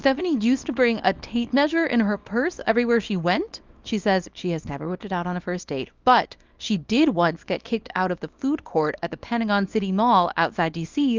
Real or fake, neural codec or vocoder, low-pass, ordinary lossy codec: fake; codec, 24 kHz, 0.9 kbps, WavTokenizer, small release; 7.2 kHz; Opus, 24 kbps